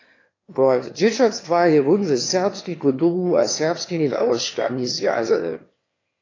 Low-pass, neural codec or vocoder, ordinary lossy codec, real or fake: 7.2 kHz; autoencoder, 22.05 kHz, a latent of 192 numbers a frame, VITS, trained on one speaker; AAC, 32 kbps; fake